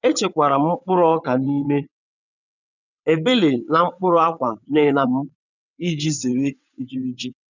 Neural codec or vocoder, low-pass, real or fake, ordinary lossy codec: vocoder, 22.05 kHz, 80 mel bands, WaveNeXt; 7.2 kHz; fake; none